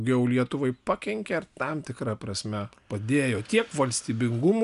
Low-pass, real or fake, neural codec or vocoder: 10.8 kHz; real; none